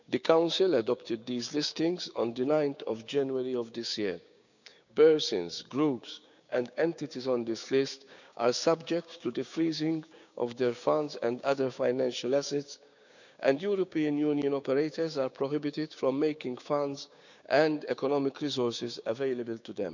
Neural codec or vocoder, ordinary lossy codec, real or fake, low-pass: codec, 16 kHz, 6 kbps, DAC; none; fake; 7.2 kHz